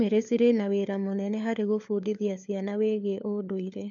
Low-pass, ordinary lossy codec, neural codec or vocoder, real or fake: 7.2 kHz; none; codec, 16 kHz, 4 kbps, FunCodec, trained on LibriTTS, 50 frames a second; fake